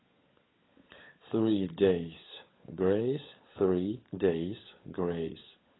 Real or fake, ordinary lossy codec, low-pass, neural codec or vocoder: fake; AAC, 16 kbps; 7.2 kHz; codec, 16 kHz, 16 kbps, FunCodec, trained on LibriTTS, 50 frames a second